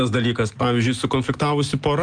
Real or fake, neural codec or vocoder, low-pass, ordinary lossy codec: real; none; 9.9 kHz; Opus, 32 kbps